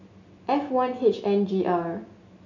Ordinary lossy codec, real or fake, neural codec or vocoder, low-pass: none; real; none; 7.2 kHz